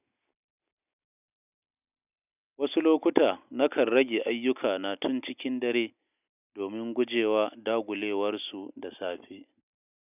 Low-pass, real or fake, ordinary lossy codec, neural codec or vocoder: 3.6 kHz; real; none; none